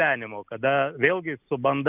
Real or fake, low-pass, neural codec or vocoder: real; 3.6 kHz; none